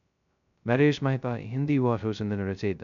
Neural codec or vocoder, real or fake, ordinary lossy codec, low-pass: codec, 16 kHz, 0.2 kbps, FocalCodec; fake; none; 7.2 kHz